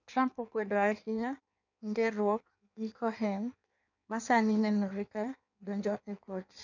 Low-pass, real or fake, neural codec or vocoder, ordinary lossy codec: 7.2 kHz; fake; codec, 16 kHz in and 24 kHz out, 1.1 kbps, FireRedTTS-2 codec; none